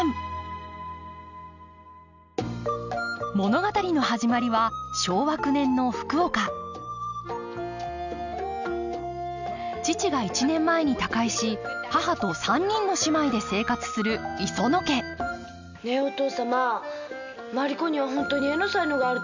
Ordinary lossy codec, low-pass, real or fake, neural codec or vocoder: none; 7.2 kHz; real; none